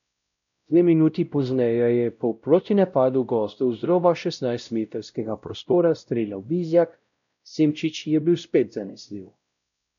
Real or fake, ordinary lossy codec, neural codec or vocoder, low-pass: fake; none; codec, 16 kHz, 0.5 kbps, X-Codec, WavLM features, trained on Multilingual LibriSpeech; 7.2 kHz